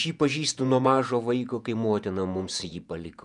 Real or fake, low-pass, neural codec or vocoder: fake; 10.8 kHz; vocoder, 48 kHz, 128 mel bands, Vocos